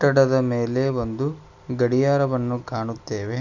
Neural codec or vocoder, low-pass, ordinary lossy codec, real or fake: none; 7.2 kHz; none; real